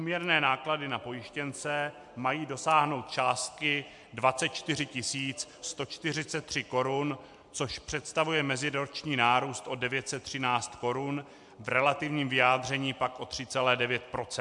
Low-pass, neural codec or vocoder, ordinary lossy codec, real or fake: 10.8 kHz; none; MP3, 64 kbps; real